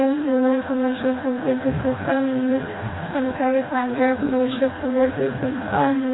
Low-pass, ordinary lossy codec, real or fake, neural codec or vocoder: 7.2 kHz; AAC, 16 kbps; fake; codec, 16 kHz, 1 kbps, FreqCodec, smaller model